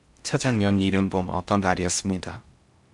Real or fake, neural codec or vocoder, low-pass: fake; codec, 16 kHz in and 24 kHz out, 0.6 kbps, FocalCodec, streaming, 4096 codes; 10.8 kHz